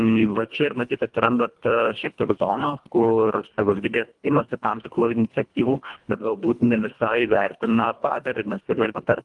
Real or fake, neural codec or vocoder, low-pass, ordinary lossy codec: fake; codec, 24 kHz, 1.5 kbps, HILCodec; 10.8 kHz; Opus, 32 kbps